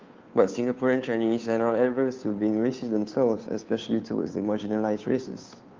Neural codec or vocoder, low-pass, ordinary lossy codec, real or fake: codec, 16 kHz, 2 kbps, FunCodec, trained on Chinese and English, 25 frames a second; 7.2 kHz; Opus, 24 kbps; fake